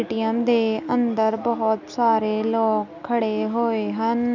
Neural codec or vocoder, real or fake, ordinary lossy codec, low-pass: none; real; none; 7.2 kHz